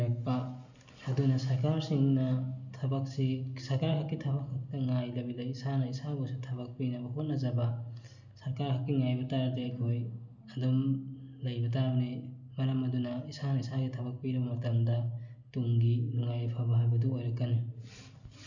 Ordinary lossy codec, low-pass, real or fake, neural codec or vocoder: none; 7.2 kHz; real; none